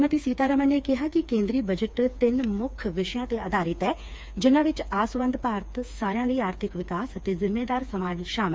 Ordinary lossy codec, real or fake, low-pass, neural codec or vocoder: none; fake; none; codec, 16 kHz, 4 kbps, FreqCodec, smaller model